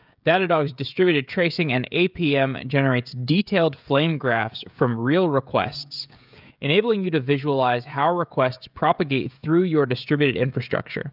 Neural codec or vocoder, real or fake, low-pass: codec, 16 kHz, 16 kbps, FreqCodec, smaller model; fake; 5.4 kHz